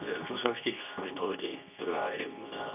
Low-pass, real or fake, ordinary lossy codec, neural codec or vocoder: 3.6 kHz; fake; none; codec, 24 kHz, 0.9 kbps, WavTokenizer, medium speech release version 2